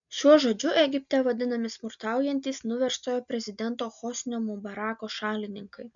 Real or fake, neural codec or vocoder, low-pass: real; none; 7.2 kHz